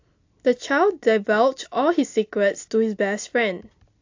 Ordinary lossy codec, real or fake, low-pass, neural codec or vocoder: AAC, 48 kbps; real; 7.2 kHz; none